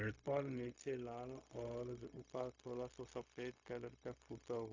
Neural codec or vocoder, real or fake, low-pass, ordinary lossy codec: codec, 16 kHz, 0.4 kbps, LongCat-Audio-Codec; fake; 7.2 kHz; AAC, 48 kbps